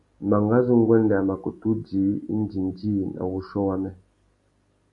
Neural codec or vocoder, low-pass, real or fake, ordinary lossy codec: none; 10.8 kHz; real; AAC, 48 kbps